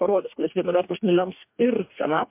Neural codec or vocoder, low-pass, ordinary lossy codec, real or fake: codec, 44.1 kHz, 2.6 kbps, DAC; 3.6 kHz; MP3, 32 kbps; fake